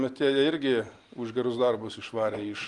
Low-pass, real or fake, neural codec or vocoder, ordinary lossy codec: 10.8 kHz; real; none; Opus, 32 kbps